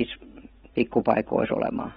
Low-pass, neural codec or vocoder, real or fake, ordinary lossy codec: 19.8 kHz; vocoder, 44.1 kHz, 128 mel bands every 256 samples, BigVGAN v2; fake; AAC, 16 kbps